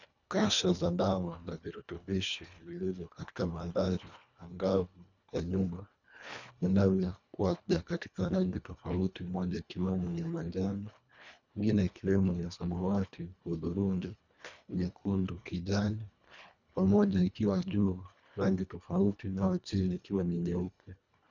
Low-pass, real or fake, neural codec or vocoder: 7.2 kHz; fake; codec, 24 kHz, 1.5 kbps, HILCodec